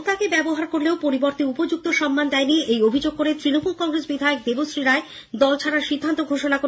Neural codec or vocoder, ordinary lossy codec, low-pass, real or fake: none; none; none; real